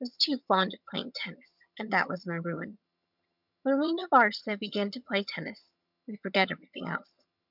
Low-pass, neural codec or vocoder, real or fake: 5.4 kHz; vocoder, 22.05 kHz, 80 mel bands, HiFi-GAN; fake